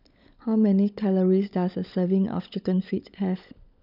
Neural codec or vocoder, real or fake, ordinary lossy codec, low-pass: codec, 16 kHz, 16 kbps, FunCodec, trained on LibriTTS, 50 frames a second; fake; none; 5.4 kHz